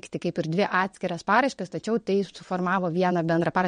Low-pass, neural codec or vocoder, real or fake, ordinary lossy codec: 9.9 kHz; vocoder, 22.05 kHz, 80 mel bands, WaveNeXt; fake; MP3, 48 kbps